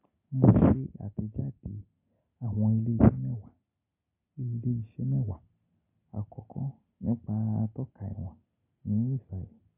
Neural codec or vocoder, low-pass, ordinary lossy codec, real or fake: none; 3.6 kHz; MP3, 32 kbps; real